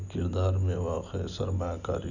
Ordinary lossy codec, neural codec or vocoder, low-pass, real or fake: none; none; 7.2 kHz; real